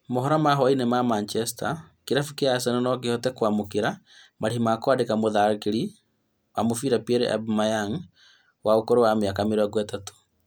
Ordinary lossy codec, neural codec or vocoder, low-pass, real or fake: none; none; none; real